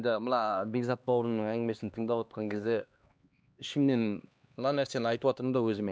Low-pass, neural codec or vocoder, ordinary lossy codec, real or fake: none; codec, 16 kHz, 2 kbps, X-Codec, HuBERT features, trained on LibriSpeech; none; fake